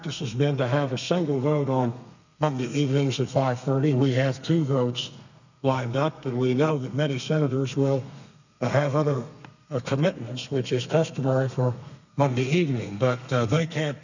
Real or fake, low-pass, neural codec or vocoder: fake; 7.2 kHz; codec, 32 kHz, 1.9 kbps, SNAC